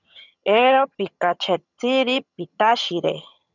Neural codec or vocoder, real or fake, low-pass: vocoder, 22.05 kHz, 80 mel bands, HiFi-GAN; fake; 7.2 kHz